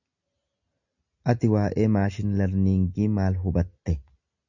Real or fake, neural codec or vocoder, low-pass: real; none; 7.2 kHz